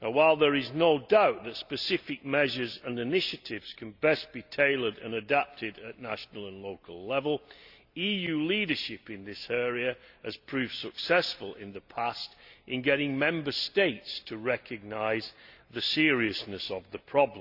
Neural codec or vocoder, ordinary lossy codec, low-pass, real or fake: none; Opus, 64 kbps; 5.4 kHz; real